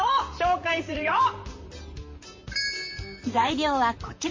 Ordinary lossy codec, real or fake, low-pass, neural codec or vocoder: MP3, 32 kbps; fake; 7.2 kHz; vocoder, 44.1 kHz, 128 mel bands, Pupu-Vocoder